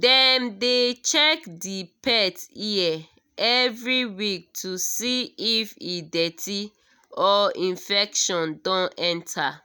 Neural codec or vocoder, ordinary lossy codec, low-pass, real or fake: none; none; none; real